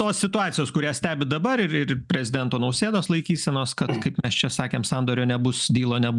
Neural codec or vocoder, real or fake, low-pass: none; real; 10.8 kHz